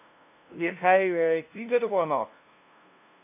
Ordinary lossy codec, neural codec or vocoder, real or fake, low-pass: AAC, 32 kbps; codec, 16 kHz, 0.5 kbps, FunCodec, trained on LibriTTS, 25 frames a second; fake; 3.6 kHz